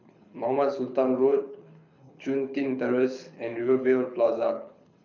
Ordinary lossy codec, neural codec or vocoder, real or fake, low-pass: none; codec, 24 kHz, 6 kbps, HILCodec; fake; 7.2 kHz